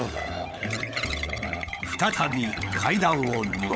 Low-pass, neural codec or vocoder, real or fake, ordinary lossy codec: none; codec, 16 kHz, 16 kbps, FunCodec, trained on LibriTTS, 50 frames a second; fake; none